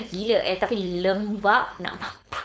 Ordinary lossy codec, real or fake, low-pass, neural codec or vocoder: none; fake; none; codec, 16 kHz, 4.8 kbps, FACodec